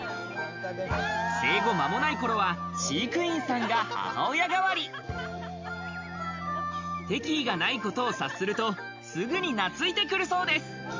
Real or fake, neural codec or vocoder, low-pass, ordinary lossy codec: real; none; 7.2 kHz; MP3, 64 kbps